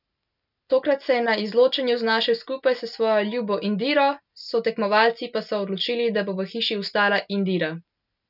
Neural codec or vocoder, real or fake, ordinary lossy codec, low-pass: none; real; none; 5.4 kHz